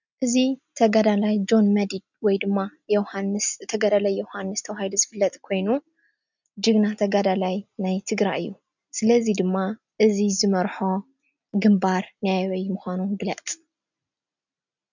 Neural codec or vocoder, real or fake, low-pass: none; real; 7.2 kHz